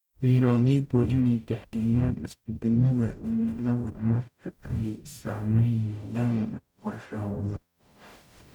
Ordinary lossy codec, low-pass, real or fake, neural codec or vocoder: none; 19.8 kHz; fake; codec, 44.1 kHz, 0.9 kbps, DAC